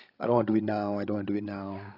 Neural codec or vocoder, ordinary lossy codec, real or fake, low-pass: codec, 16 kHz, 16 kbps, FreqCodec, larger model; AAC, 24 kbps; fake; 5.4 kHz